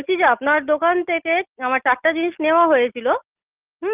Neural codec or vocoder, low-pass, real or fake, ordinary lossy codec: none; 3.6 kHz; real; Opus, 24 kbps